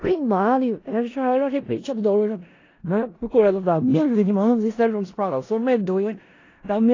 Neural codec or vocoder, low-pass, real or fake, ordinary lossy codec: codec, 16 kHz in and 24 kHz out, 0.4 kbps, LongCat-Audio-Codec, four codebook decoder; 7.2 kHz; fake; AAC, 32 kbps